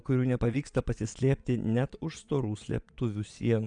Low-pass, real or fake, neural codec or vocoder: 9.9 kHz; fake; vocoder, 22.05 kHz, 80 mel bands, Vocos